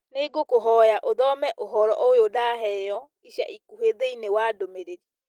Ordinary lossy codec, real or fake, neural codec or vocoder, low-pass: Opus, 24 kbps; fake; vocoder, 44.1 kHz, 128 mel bands every 256 samples, BigVGAN v2; 19.8 kHz